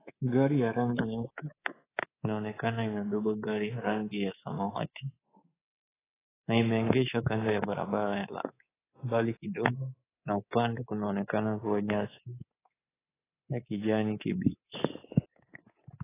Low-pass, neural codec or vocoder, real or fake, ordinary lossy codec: 3.6 kHz; codec, 24 kHz, 3.1 kbps, DualCodec; fake; AAC, 16 kbps